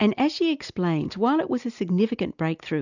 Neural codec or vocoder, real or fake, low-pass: none; real; 7.2 kHz